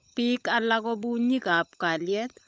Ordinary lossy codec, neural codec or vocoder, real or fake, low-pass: none; codec, 16 kHz, 16 kbps, FunCodec, trained on LibriTTS, 50 frames a second; fake; none